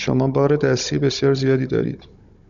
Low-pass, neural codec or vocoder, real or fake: 7.2 kHz; codec, 16 kHz, 16 kbps, FunCodec, trained on Chinese and English, 50 frames a second; fake